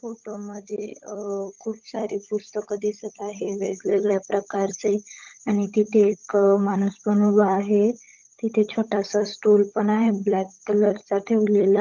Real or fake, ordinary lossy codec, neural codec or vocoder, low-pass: fake; Opus, 32 kbps; codec, 16 kHz, 16 kbps, FunCodec, trained on LibriTTS, 50 frames a second; 7.2 kHz